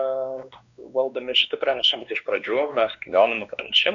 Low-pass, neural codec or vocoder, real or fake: 7.2 kHz; codec, 16 kHz, 2 kbps, X-Codec, WavLM features, trained on Multilingual LibriSpeech; fake